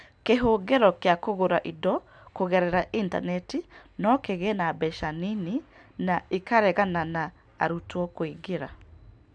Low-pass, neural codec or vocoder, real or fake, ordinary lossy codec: 9.9 kHz; none; real; none